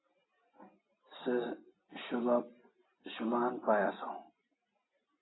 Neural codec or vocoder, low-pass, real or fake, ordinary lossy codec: none; 7.2 kHz; real; AAC, 16 kbps